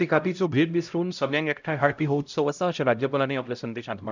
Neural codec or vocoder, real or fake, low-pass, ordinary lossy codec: codec, 16 kHz, 0.5 kbps, X-Codec, HuBERT features, trained on LibriSpeech; fake; 7.2 kHz; none